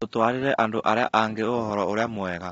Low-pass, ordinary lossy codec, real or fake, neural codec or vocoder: 14.4 kHz; AAC, 24 kbps; real; none